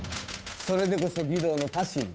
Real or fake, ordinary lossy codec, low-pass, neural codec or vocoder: fake; none; none; codec, 16 kHz, 8 kbps, FunCodec, trained on Chinese and English, 25 frames a second